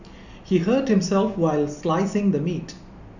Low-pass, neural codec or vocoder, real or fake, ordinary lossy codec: 7.2 kHz; none; real; none